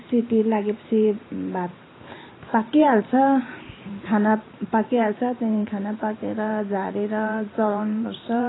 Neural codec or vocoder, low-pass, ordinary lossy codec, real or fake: vocoder, 44.1 kHz, 128 mel bands every 512 samples, BigVGAN v2; 7.2 kHz; AAC, 16 kbps; fake